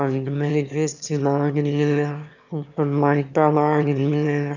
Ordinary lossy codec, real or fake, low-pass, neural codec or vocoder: none; fake; 7.2 kHz; autoencoder, 22.05 kHz, a latent of 192 numbers a frame, VITS, trained on one speaker